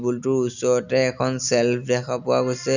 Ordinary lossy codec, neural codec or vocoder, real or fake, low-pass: none; none; real; 7.2 kHz